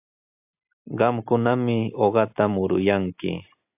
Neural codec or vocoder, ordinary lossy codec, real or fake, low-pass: none; AAC, 32 kbps; real; 3.6 kHz